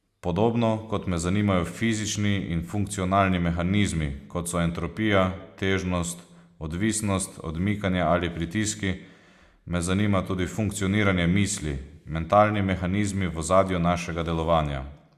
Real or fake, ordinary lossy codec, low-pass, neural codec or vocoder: real; Opus, 64 kbps; 14.4 kHz; none